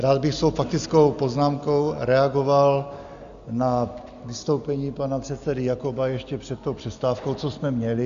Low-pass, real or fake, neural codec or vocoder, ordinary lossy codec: 7.2 kHz; real; none; Opus, 64 kbps